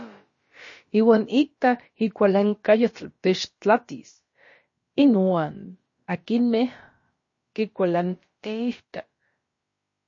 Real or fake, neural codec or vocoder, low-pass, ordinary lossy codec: fake; codec, 16 kHz, about 1 kbps, DyCAST, with the encoder's durations; 7.2 kHz; MP3, 32 kbps